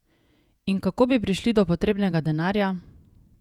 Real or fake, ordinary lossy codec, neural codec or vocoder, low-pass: fake; none; vocoder, 48 kHz, 128 mel bands, Vocos; 19.8 kHz